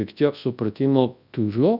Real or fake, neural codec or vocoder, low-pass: fake; codec, 24 kHz, 0.9 kbps, WavTokenizer, large speech release; 5.4 kHz